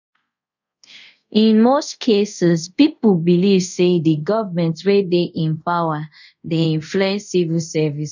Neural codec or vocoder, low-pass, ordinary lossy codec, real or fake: codec, 24 kHz, 0.5 kbps, DualCodec; 7.2 kHz; none; fake